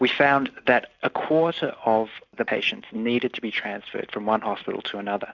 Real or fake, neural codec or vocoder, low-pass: real; none; 7.2 kHz